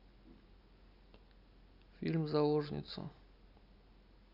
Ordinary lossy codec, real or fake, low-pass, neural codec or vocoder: none; real; 5.4 kHz; none